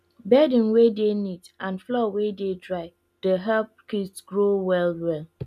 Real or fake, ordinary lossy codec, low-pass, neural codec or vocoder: real; none; 14.4 kHz; none